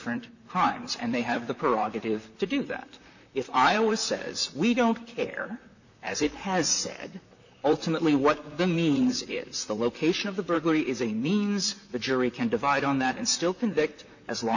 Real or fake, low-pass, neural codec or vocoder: fake; 7.2 kHz; vocoder, 44.1 kHz, 128 mel bands, Pupu-Vocoder